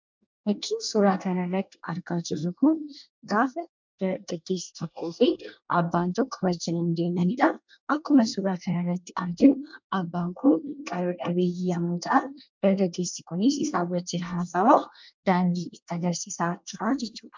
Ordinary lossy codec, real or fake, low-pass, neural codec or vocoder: MP3, 64 kbps; fake; 7.2 kHz; codec, 24 kHz, 1 kbps, SNAC